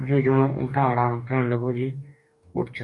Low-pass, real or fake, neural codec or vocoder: 10.8 kHz; fake; codec, 44.1 kHz, 2.6 kbps, DAC